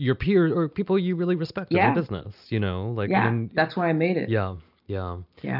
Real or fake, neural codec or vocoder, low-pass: real; none; 5.4 kHz